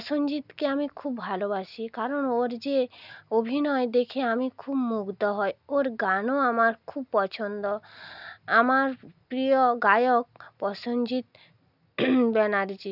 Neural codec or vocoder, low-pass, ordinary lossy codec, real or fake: none; 5.4 kHz; none; real